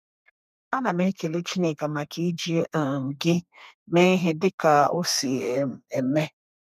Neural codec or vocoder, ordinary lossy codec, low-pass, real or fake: codec, 32 kHz, 1.9 kbps, SNAC; none; 14.4 kHz; fake